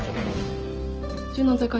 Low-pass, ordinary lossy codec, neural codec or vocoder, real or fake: 7.2 kHz; Opus, 16 kbps; none; real